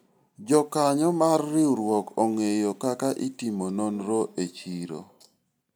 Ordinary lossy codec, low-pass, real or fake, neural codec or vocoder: none; none; real; none